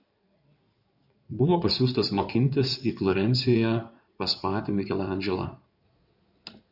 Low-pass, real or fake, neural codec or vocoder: 5.4 kHz; fake; codec, 16 kHz in and 24 kHz out, 2.2 kbps, FireRedTTS-2 codec